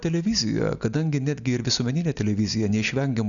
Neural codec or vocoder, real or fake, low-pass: none; real; 7.2 kHz